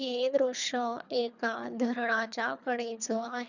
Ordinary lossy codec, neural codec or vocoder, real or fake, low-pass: none; codec, 24 kHz, 3 kbps, HILCodec; fake; 7.2 kHz